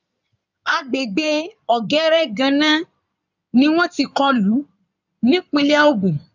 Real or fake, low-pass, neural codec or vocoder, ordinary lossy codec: fake; 7.2 kHz; codec, 16 kHz in and 24 kHz out, 2.2 kbps, FireRedTTS-2 codec; none